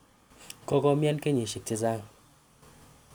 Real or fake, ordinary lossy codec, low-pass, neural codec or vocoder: real; none; none; none